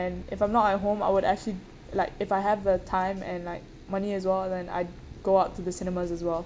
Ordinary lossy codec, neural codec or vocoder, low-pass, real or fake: none; none; none; real